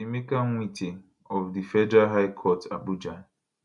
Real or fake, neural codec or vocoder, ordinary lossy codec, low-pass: real; none; none; 10.8 kHz